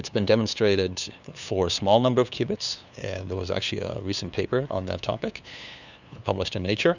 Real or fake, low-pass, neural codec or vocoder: fake; 7.2 kHz; codec, 16 kHz, 2 kbps, FunCodec, trained on LibriTTS, 25 frames a second